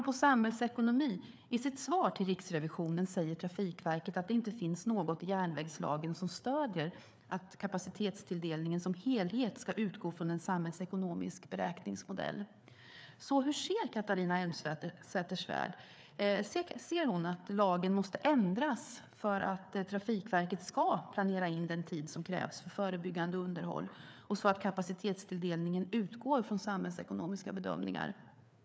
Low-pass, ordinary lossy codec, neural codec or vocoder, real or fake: none; none; codec, 16 kHz, 4 kbps, FreqCodec, larger model; fake